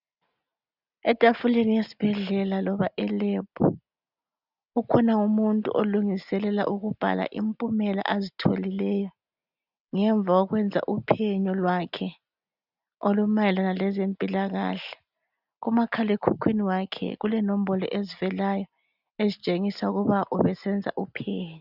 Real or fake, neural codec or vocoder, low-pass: real; none; 5.4 kHz